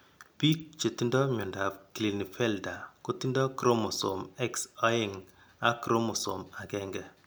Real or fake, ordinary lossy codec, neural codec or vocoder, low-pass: real; none; none; none